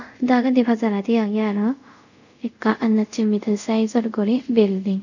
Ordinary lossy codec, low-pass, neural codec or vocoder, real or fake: none; 7.2 kHz; codec, 24 kHz, 0.5 kbps, DualCodec; fake